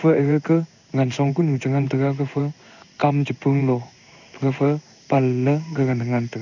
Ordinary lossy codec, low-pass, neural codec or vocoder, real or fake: none; 7.2 kHz; codec, 16 kHz in and 24 kHz out, 1 kbps, XY-Tokenizer; fake